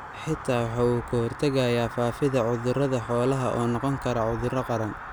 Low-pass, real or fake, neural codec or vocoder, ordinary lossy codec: none; real; none; none